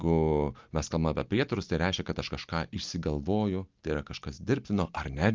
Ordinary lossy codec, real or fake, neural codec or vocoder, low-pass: Opus, 24 kbps; real; none; 7.2 kHz